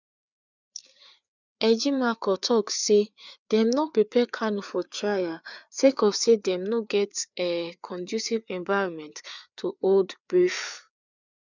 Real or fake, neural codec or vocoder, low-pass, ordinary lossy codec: fake; codec, 16 kHz, 4 kbps, FreqCodec, larger model; 7.2 kHz; none